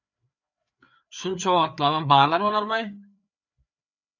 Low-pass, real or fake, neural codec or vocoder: 7.2 kHz; fake; codec, 16 kHz, 4 kbps, FreqCodec, larger model